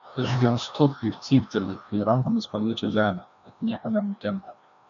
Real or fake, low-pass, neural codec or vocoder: fake; 7.2 kHz; codec, 16 kHz, 1 kbps, FreqCodec, larger model